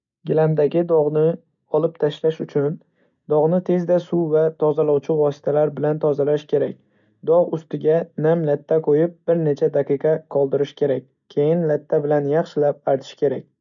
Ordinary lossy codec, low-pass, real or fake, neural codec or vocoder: none; 7.2 kHz; real; none